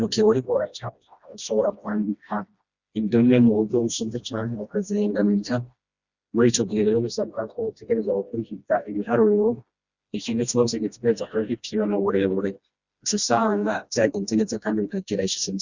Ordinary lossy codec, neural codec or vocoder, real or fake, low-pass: Opus, 64 kbps; codec, 16 kHz, 1 kbps, FreqCodec, smaller model; fake; 7.2 kHz